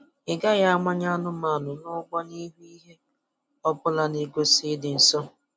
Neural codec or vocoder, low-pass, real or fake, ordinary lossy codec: none; none; real; none